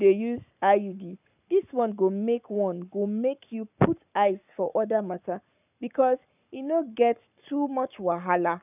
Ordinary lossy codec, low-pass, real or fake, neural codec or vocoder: none; 3.6 kHz; fake; codec, 44.1 kHz, 7.8 kbps, Pupu-Codec